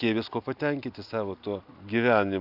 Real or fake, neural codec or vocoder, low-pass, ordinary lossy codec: real; none; 5.4 kHz; AAC, 48 kbps